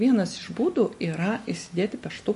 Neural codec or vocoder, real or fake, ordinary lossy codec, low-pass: none; real; MP3, 48 kbps; 14.4 kHz